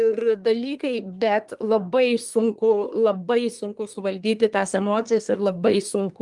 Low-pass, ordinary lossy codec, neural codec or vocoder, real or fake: 10.8 kHz; Opus, 32 kbps; codec, 24 kHz, 1 kbps, SNAC; fake